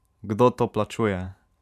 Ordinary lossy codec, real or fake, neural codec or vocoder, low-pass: none; fake; vocoder, 44.1 kHz, 128 mel bands every 512 samples, BigVGAN v2; 14.4 kHz